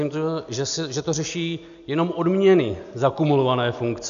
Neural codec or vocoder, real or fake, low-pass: none; real; 7.2 kHz